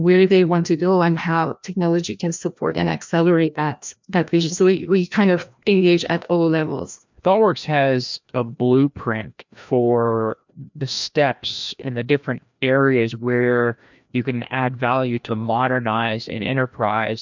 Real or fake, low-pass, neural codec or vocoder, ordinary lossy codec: fake; 7.2 kHz; codec, 16 kHz, 1 kbps, FreqCodec, larger model; MP3, 64 kbps